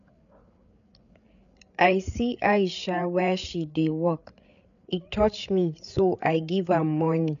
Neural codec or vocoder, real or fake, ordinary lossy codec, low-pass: codec, 16 kHz, 8 kbps, FreqCodec, larger model; fake; none; 7.2 kHz